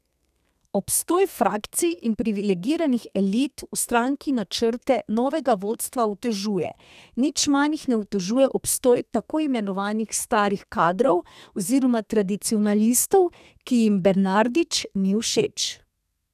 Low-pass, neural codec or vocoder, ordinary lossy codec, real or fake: 14.4 kHz; codec, 32 kHz, 1.9 kbps, SNAC; none; fake